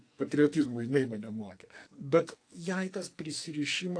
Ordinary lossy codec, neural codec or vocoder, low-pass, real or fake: AAC, 48 kbps; codec, 44.1 kHz, 2.6 kbps, SNAC; 9.9 kHz; fake